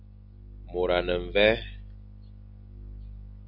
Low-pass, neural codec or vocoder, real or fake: 5.4 kHz; none; real